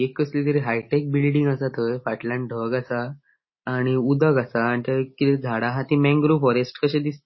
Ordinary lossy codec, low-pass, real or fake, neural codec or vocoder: MP3, 24 kbps; 7.2 kHz; real; none